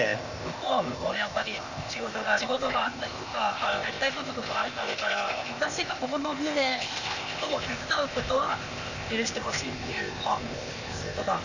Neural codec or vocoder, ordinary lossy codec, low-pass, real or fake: codec, 16 kHz, 0.8 kbps, ZipCodec; none; 7.2 kHz; fake